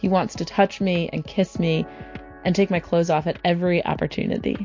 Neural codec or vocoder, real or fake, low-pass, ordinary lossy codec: none; real; 7.2 kHz; MP3, 48 kbps